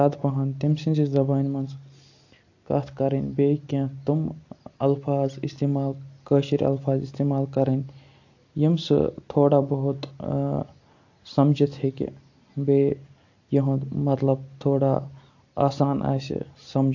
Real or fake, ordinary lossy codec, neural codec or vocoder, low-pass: real; AAC, 48 kbps; none; 7.2 kHz